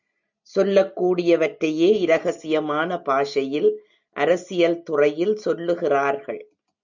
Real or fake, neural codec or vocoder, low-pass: real; none; 7.2 kHz